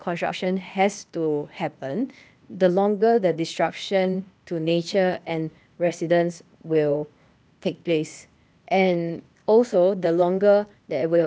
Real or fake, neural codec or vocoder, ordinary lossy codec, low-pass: fake; codec, 16 kHz, 0.8 kbps, ZipCodec; none; none